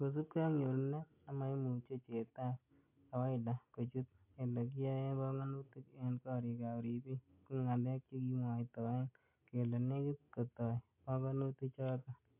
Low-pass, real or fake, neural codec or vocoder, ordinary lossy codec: 3.6 kHz; real; none; MP3, 32 kbps